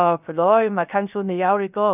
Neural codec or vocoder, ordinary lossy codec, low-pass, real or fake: codec, 16 kHz, 0.3 kbps, FocalCodec; none; 3.6 kHz; fake